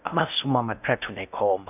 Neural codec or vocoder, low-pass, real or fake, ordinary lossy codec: codec, 16 kHz in and 24 kHz out, 0.6 kbps, FocalCodec, streaming, 4096 codes; 3.6 kHz; fake; none